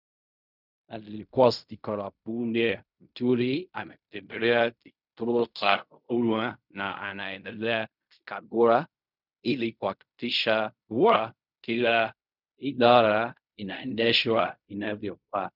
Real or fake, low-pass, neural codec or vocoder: fake; 5.4 kHz; codec, 16 kHz in and 24 kHz out, 0.4 kbps, LongCat-Audio-Codec, fine tuned four codebook decoder